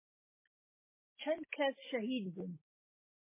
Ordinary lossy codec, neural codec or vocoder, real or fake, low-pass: MP3, 16 kbps; none; real; 3.6 kHz